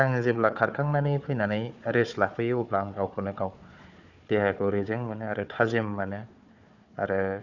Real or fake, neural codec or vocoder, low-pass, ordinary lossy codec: fake; codec, 16 kHz, 4 kbps, FunCodec, trained on Chinese and English, 50 frames a second; 7.2 kHz; none